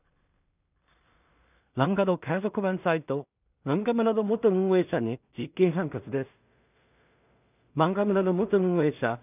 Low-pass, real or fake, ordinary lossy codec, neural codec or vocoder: 3.6 kHz; fake; none; codec, 16 kHz in and 24 kHz out, 0.4 kbps, LongCat-Audio-Codec, two codebook decoder